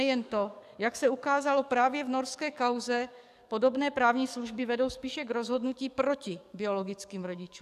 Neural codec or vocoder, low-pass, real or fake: codec, 44.1 kHz, 7.8 kbps, DAC; 14.4 kHz; fake